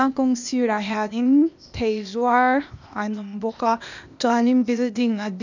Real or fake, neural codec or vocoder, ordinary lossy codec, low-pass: fake; codec, 16 kHz, 0.8 kbps, ZipCodec; none; 7.2 kHz